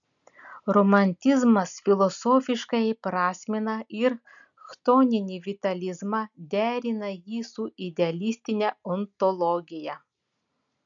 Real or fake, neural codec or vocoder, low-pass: real; none; 7.2 kHz